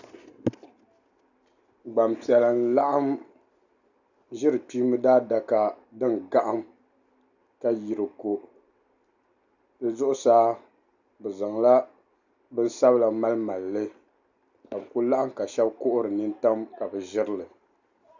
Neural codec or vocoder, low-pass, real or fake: none; 7.2 kHz; real